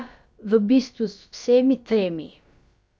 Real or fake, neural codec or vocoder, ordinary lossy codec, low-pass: fake; codec, 16 kHz, about 1 kbps, DyCAST, with the encoder's durations; none; none